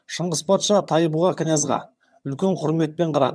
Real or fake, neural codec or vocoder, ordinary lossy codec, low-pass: fake; vocoder, 22.05 kHz, 80 mel bands, HiFi-GAN; none; none